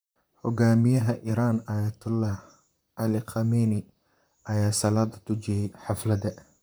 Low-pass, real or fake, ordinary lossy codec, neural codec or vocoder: none; fake; none; vocoder, 44.1 kHz, 128 mel bands, Pupu-Vocoder